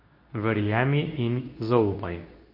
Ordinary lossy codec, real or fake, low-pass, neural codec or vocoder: MP3, 32 kbps; fake; 5.4 kHz; codec, 24 kHz, 0.9 kbps, WavTokenizer, medium speech release version 2